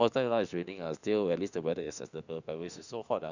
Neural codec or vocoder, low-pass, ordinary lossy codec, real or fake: autoencoder, 48 kHz, 32 numbers a frame, DAC-VAE, trained on Japanese speech; 7.2 kHz; none; fake